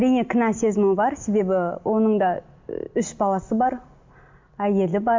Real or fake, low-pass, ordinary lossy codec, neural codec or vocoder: real; 7.2 kHz; MP3, 64 kbps; none